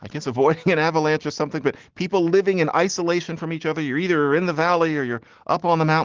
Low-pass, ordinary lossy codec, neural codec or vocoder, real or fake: 7.2 kHz; Opus, 16 kbps; none; real